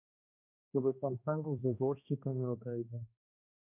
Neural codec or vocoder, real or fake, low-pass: codec, 16 kHz, 1 kbps, X-Codec, HuBERT features, trained on balanced general audio; fake; 3.6 kHz